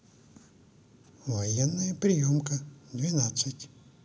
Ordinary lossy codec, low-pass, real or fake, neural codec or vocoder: none; none; real; none